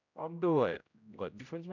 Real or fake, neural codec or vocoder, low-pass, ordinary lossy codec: fake; codec, 16 kHz, 0.5 kbps, X-Codec, HuBERT features, trained on general audio; 7.2 kHz; none